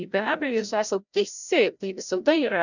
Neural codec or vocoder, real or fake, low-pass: codec, 16 kHz, 0.5 kbps, FreqCodec, larger model; fake; 7.2 kHz